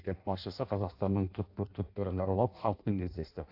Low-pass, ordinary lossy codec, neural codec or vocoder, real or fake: 5.4 kHz; AAC, 32 kbps; codec, 16 kHz in and 24 kHz out, 0.6 kbps, FireRedTTS-2 codec; fake